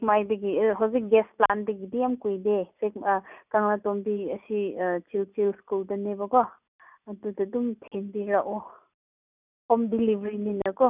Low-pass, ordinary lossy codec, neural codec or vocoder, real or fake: 3.6 kHz; none; none; real